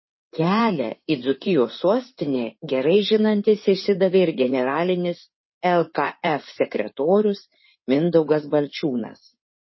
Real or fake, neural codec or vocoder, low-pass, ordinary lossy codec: fake; codec, 16 kHz in and 24 kHz out, 2.2 kbps, FireRedTTS-2 codec; 7.2 kHz; MP3, 24 kbps